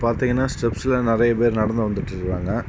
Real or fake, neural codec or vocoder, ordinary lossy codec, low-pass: real; none; none; none